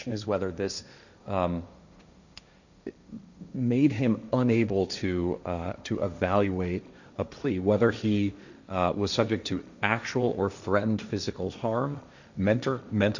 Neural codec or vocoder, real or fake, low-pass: codec, 16 kHz, 1.1 kbps, Voila-Tokenizer; fake; 7.2 kHz